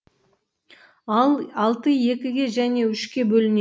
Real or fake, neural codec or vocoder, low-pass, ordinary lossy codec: real; none; none; none